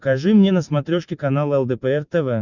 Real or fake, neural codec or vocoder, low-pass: real; none; 7.2 kHz